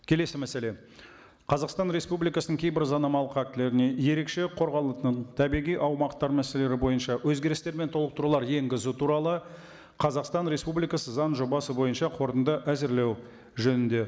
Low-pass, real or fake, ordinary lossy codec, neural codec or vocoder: none; real; none; none